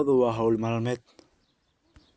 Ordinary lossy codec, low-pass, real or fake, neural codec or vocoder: none; none; real; none